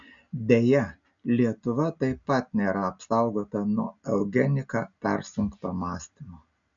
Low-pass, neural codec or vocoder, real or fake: 7.2 kHz; none; real